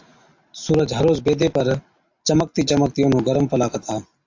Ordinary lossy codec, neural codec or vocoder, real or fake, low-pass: AAC, 48 kbps; none; real; 7.2 kHz